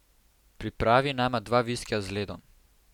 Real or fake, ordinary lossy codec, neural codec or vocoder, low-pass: real; none; none; 19.8 kHz